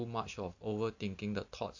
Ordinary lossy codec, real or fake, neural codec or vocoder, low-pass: AAC, 48 kbps; real; none; 7.2 kHz